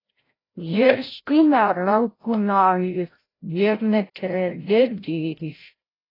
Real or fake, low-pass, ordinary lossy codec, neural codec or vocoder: fake; 5.4 kHz; AAC, 24 kbps; codec, 16 kHz, 0.5 kbps, FreqCodec, larger model